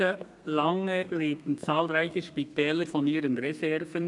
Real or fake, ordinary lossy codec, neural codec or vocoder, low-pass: fake; none; codec, 32 kHz, 1.9 kbps, SNAC; 10.8 kHz